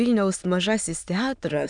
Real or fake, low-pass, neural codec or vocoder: fake; 9.9 kHz; autoencoder, 22.05 kHz, a latent of 192 numbers a frame, VITS, trained on many speakers